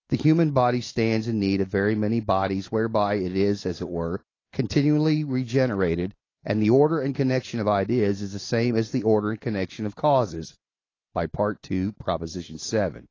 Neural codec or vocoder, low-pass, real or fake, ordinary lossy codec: none; 7.2 kHz; real; AAC, 32 kbps